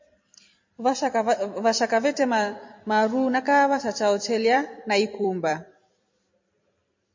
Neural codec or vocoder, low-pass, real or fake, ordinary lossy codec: vocoder, 44.1 kHz, 128 mel bands every 256 samples, BigVGAN v2; 7.2 kHz; fake; MP3, 32 kbps